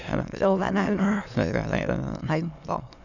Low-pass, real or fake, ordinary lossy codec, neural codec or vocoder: 7.2 kHz; fake; none; autoencoder, 22.05 kHz, a latent of 192 numbers a frame, VITS, trained on many speakers